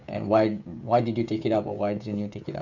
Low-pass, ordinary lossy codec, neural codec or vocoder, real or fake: 7.2 kHz; none; codec, 16 kHz, 16 kbps, FreqCodec, smaller model; fake